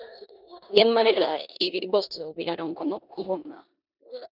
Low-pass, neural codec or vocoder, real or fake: 5.4 kHz; codec, 16 kHz in and 24 kHz out, 0.9 kbps, LongCat-Audio-Codec, four codebook decoder; fake